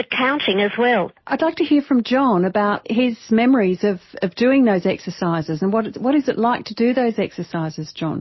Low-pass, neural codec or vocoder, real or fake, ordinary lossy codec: 7.2 kHz; none; real; MP3, 24 kbps